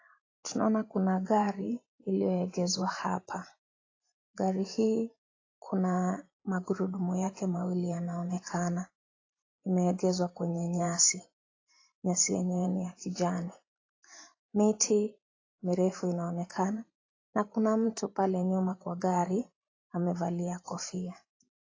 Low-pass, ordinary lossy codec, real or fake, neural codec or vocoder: 7.2 kHz; AAC, 32 kbps; fake; vocoder, 44.1 kHz, 128 mel bands every 512 samples, BigVGAN v2